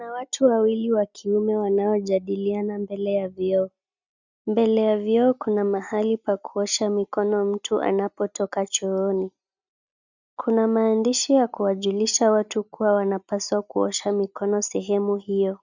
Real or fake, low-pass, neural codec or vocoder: real; 7.2 kHz; none